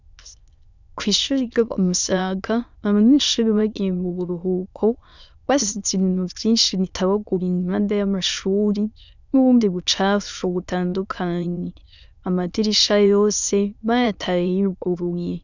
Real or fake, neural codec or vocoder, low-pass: fake; autoencoder, 22.05 kHz, a latent of 192 numbers a frame, VITS, trained on many speakers; 7.2 kHz